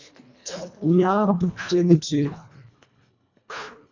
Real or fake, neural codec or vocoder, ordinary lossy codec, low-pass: fake; codec, 24 kHz, 1.5 kbps, HILCodec; MP3, 64 kbps; 7.2 kHz